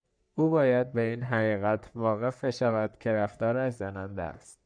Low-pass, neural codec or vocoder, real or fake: 9.9 kHz; codec, 44.1 kHz, 3.4 kbps, Pupu-Codec; fake